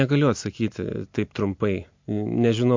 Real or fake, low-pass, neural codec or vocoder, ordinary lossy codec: real; 7.2 kHz; none; MP3, 48 kbps